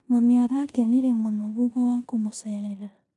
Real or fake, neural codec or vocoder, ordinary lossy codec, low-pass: fake; codec, 16 kHz in and 24 kHz out, 0.9 kbps, LongCat-Audio-Codec, four codebook decoder; none; 10.8 kHz